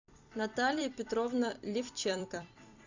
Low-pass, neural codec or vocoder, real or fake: 7.2 kHz; vocoder, 44.1 kHz, 128 mel bands every 256 samples, BigVGAN v2; fake